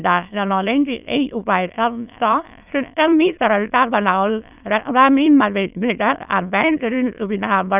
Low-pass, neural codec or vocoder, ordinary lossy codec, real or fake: 3.6 kHz; autoencoder, 22.05 kHz, a latent of 192 numbers a frame, VITS, trained on many speakers; none; fake